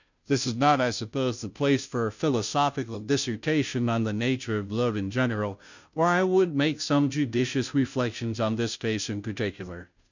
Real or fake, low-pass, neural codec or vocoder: fake; 7.2 kHz; codec, 16 kHz, 0.5 kbps, FunCodec, trained on Chinese and English, 25 frames a second